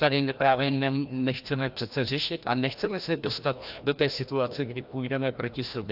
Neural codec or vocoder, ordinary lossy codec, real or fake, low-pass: codec, 16 kHz, 1 kbps, FreqCodec, larger model; AAC, 48 kbps; fake; 5.4 kHz